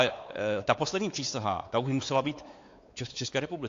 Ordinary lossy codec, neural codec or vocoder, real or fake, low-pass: AAC, 48 kbps; codec, 16 kHz, 4 kbps, X-Codec, WavLM features, trained on Multilingual LibriSpeech; fake; 7.2 kHz